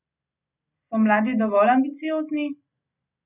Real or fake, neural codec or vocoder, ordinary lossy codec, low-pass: real; none; none; 3.6 kHz